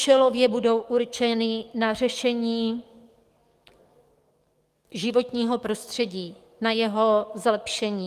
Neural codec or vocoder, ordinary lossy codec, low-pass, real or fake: codec, 44.1 kHz, 7.8 kbps, DAC; Opus, 32 kbps; 14.4 kHz; fake